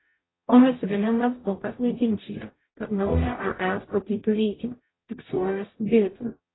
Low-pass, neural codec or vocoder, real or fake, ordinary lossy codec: 7.2 kHz; codec, 44.1 kHz, 0.9 kbps, DAC; fake; AAC, 16 kbps